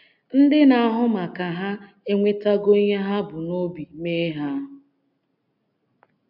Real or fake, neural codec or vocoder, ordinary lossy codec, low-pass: real; none; none; 5.4 kHz